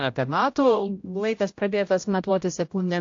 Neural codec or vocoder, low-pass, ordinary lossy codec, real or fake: codec, 16 kHz, 0.5 kbps, X-Codec, HuBERT features, trained on general audio; 7.2 kHz; AAC, 48 kbps; fake